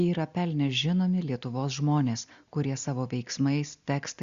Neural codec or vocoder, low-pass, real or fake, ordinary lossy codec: none; 7.2 kHz; real; Opus, 64 kbps